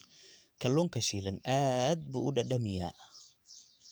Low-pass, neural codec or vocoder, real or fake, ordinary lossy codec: none; codec, 44.1 kHz, 7.8 kbps, DAC; fake; none